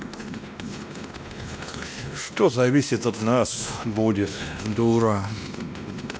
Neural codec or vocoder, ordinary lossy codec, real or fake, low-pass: codec, 16 kHz, 1 kbps, X-Codec, WavLM features, trained on Multilingual LibriSpeech; none; fake; none